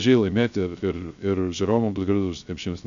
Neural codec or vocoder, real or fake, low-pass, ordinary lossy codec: codec, 16 kHz, 0.3 kbps, FocalCodec; fake; 7.2 kHz; AAC, 96 kbps